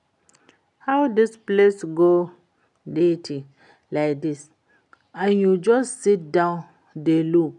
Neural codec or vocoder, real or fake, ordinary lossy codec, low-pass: none; real; none; 10.8 kHz